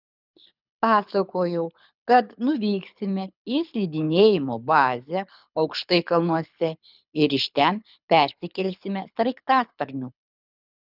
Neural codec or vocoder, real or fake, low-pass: codec, 24 kHz, 6 kbps, HILCodec; fake; 5.4 kHz